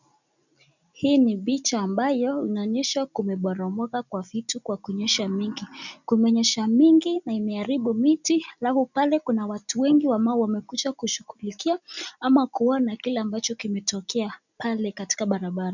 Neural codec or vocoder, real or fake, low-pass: none; real; 7.2 kHz